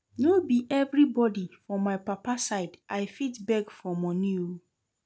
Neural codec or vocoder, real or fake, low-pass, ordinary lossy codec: none; real; none; none